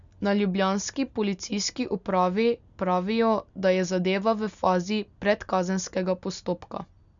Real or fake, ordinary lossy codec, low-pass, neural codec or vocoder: real; none; 7.2 kHz; none